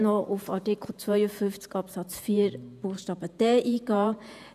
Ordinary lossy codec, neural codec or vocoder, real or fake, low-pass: none; vocoder, 48 kHz, 128 mel bands, Vocos; fake; 14.4 kHz